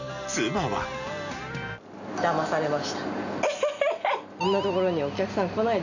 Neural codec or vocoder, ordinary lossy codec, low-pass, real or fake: none; none; 7.2 kHz; real